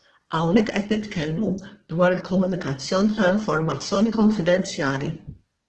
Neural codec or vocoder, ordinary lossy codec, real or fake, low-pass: codec, 24 kHz, 1 kbps, SNAC; Opus, 16 kbps; fake; 10.8 kHz